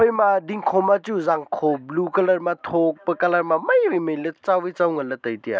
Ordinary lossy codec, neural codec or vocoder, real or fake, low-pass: none; none; real; none